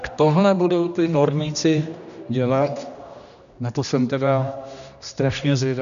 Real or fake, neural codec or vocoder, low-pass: fake; codec, 16 kHz, 1 kbps, X-Codec, HuBERT features, trained on general audio; 7.2 kHz